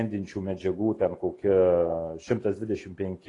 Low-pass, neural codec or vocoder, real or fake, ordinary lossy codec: 10.8 kHz; none; real; AAC, 32 kbps